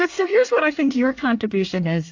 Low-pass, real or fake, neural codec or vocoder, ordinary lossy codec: 7.2 kHz; fake; codec, 24 kHz, 1 kbps, SNAC; AAC, 48 kbps